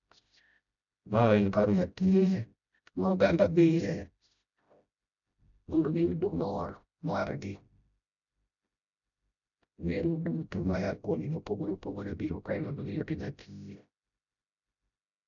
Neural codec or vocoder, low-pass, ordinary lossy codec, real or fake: codec, 16 kHz, 0.5 kbps, FreqCodec, smaller model; 7.2 kHz; none; fake